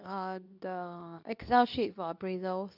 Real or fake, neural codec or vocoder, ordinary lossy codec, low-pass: fake; codec, 24 kHz, 0.9 kbps, WavTokenizer, medium speech release version 2; none; 5.4 kHz